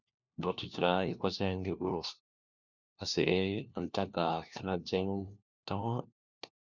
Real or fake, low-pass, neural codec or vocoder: fake; 7.2 kHz; codec, 16 kHz, 1 kbps, FunCodec, trained on LibriTTS, 50 frames a second